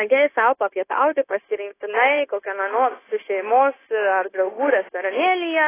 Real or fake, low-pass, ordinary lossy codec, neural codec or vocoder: fake; 3.6 kHz; AAC, 16 kbps; codec, 16 kHz, 0.9 kbps, LongCat-Audio-Codec